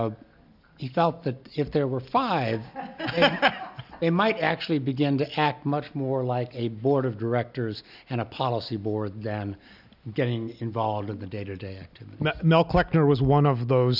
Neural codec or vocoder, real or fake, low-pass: none; real; 5.4 kHz